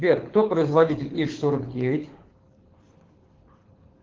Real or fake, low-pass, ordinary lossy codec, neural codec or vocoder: fake; 7.2 kHz; Opus, 16 kbps; codec, 24 kHz, 6 kbps, HILCodec